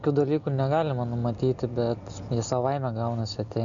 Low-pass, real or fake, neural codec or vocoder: 7.2 kHz; real; none